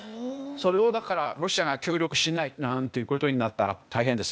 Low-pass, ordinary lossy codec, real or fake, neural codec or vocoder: none; none; fake; codec, 16 kHz, 0.8 kbps, ZipCodec